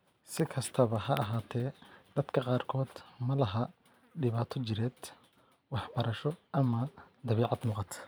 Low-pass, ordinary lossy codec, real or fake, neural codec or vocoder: none; none; fake; vocoder, 44.1 kHz, 128 mel bands every 512 samples, BigVGAN v2